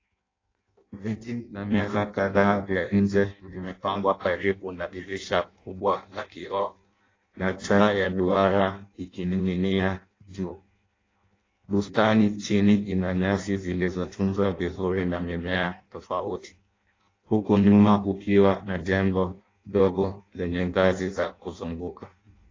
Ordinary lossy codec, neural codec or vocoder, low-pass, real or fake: AAC, 32 kbps; codec, 16 kHz in and 24 kHz out, 0.6 kbps, FireRedTTS-2 codec; 7.2 kHz; fake